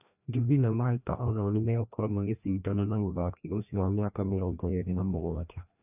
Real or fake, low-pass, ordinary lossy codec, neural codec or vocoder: fake; 3.6 kHz; none; codec, 16 kHz, 1 kbps, FreqCodec, larger model